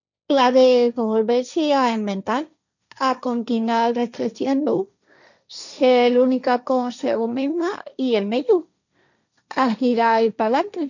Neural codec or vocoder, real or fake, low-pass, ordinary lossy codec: codec, 16 kHz, 1.1 kbps, Voila-Tokenizer; fake; 7.2 kHz; none